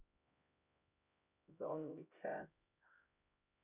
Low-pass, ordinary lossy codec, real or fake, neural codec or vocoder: 3.6 kHz; none; fake; codec, 16 kHz, 0.5 kbps, X-Codec, HuBERT features, trained on LibriSpeech